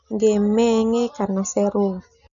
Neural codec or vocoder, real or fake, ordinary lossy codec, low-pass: none; real; none; 7.2 kHz